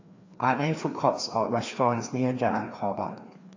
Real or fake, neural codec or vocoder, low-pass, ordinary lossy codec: fake; codec, 16 kHz, 2 kbps, FreqCodec, larger model; 7.2 kHz; AAC, 48 kbps